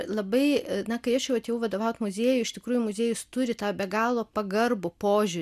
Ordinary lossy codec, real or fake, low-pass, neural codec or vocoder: MP3, 96 kbps; real; 14.4 kHz; none